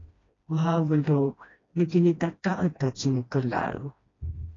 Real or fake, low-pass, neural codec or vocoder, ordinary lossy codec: fake; 7.2 kHz; codec, 16 kHz, 1 kbps, FreqCodec, smaller model; AAC, 32 kbps